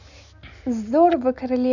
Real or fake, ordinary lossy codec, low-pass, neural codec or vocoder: real; none; 7.2 kHz; none